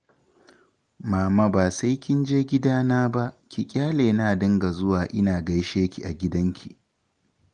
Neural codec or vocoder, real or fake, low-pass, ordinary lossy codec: none; real; 9.9 kHz; Opus, 24 kbps